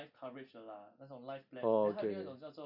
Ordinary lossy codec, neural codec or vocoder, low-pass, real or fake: none; none; 5.4 kHz; real